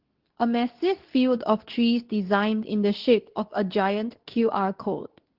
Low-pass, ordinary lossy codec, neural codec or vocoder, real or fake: 5.4 kHz; Opus, 16 kbps; codec, 24 kHz, 0.9 kbps, WavTokenizer, medium speech release version 2; fake